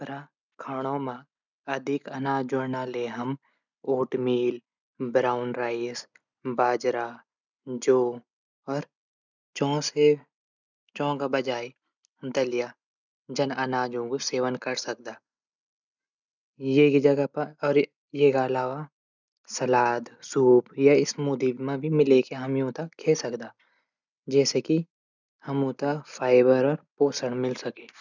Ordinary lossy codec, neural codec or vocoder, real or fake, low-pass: none; none; real; 7.2 kHz